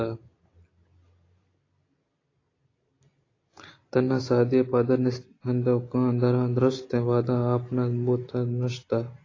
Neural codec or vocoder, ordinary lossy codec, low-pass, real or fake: none; AAC, 32 kbps; 7.2 kHz; real